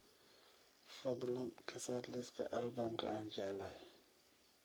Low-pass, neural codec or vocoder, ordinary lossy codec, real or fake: none; codec, 44.1 kHz, 3.4 kbps, Pupu-Codec; none; fake